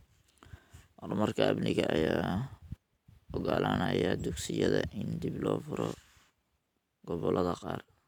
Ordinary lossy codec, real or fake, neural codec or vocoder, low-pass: none; fake; vocoder, 44.1 kHz, 128 mel bands every 512 samples, BigVGAN v2; 19.8 kHz